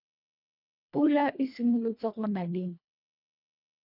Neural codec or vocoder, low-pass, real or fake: codec, 24 kHz, 1.5 kbps, HILCodec; 5.4 kHz; fake